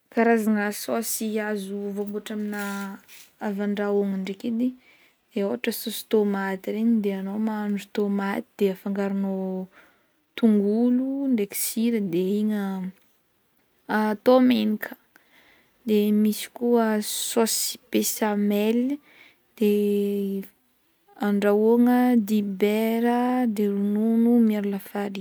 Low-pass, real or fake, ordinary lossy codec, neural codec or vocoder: none; real; none; none